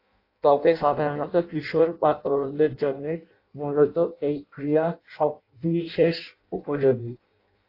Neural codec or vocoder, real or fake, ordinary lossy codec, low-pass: codec, 16 kHz in and 24 kHz out, 0.6 kbps, FireRedTTS-2 codec; fake; AAC, 32 kbps; 5.4 kHz